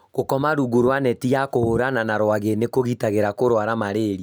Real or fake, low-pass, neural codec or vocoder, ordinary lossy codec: real; none; none; none